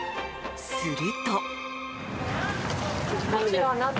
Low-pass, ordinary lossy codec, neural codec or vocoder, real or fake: none; none; none; real